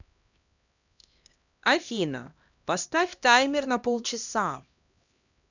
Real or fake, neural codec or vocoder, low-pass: fake; codec, 16 kHz, 1 kbps, X-Codec, HuBERT features, trained on LibriSpeech; 7.2 kHz